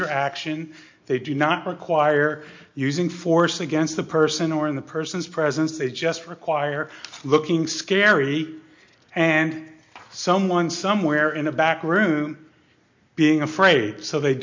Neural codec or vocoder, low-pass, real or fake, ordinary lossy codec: none; 7.2 kHz; real; MP3, 48 kbps